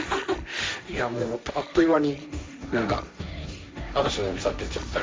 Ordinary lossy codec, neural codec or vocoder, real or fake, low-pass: none; codec, 16 kHz, 1.1 kbps, Voila-Tokenizer; fake; none